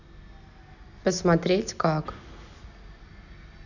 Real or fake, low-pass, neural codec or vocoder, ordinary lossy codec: real; 7.2 kHz; none; none